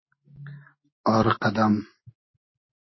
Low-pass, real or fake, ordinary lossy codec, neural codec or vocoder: 7.2 kHz; real; MP3, 24 kbps; none